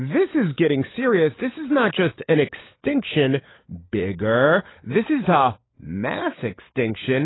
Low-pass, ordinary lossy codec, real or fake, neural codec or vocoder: 7.2 kHz; AAC, 16 kbps; fake; codec, 16 kHz, 16 kbps, FunCodec, trained on LibriTTS, 50 frames a second